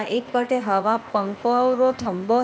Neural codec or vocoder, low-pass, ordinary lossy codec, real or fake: codec, 16 kHz, 0.8 kbps, ZipCodec; none; none; fake